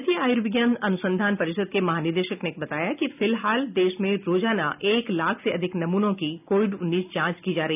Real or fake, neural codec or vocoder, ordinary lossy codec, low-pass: real; none; none; 3.6 kHz